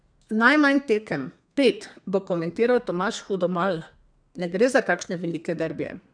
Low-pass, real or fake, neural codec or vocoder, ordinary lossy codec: 9.9 kHz; fake; codec, 32 kHz, 1.9 kbps, SNAC; none